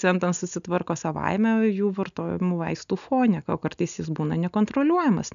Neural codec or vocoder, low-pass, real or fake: none; 7.2 kHz; real